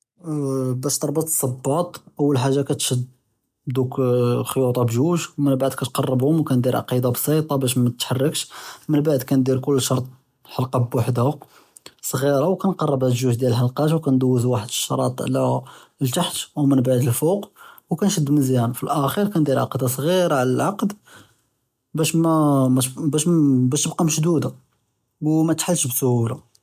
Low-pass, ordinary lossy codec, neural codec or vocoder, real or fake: 14.4 kHz; MP3, 96 kbps; none; real